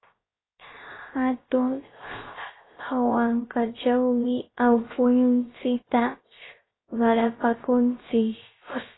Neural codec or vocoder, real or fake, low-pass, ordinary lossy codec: codec, 16 kHz, 0.3 kbps, FocalCodec; fake; 7.2 kHz; AAC, 16 kbps